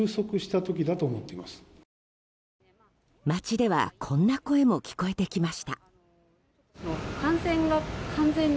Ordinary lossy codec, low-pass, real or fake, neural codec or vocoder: none; none; real; none